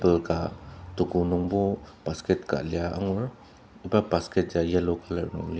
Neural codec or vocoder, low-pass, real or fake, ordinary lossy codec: none; none; real; none